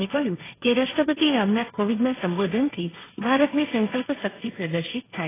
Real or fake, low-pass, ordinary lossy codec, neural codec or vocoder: fake; 3.6 kHz; AAC, 16 kbps; codec, 16 kHz, 1.1 kbps, Voila-Tokenizer